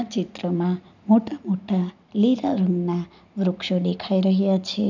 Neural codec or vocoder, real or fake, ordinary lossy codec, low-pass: none; real; none; 7.2 kHz